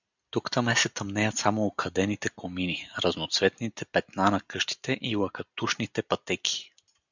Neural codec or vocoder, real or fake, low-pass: none; real; 7.2 kHz